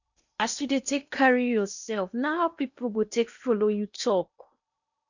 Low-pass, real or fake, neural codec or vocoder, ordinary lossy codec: 7.2 kHz; fake; codec, 16 kHz in and 24 kHz out, 0.8 kbps, FocalCodec, streaming, 65536 codes; none